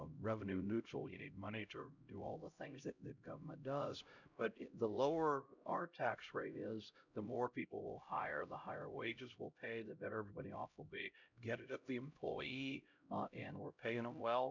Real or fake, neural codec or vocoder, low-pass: fake; codec, 16 kHz, 0.5 kbps, X-Codec, HuBERT features, trained on LibriSpeech; 7.2 kHz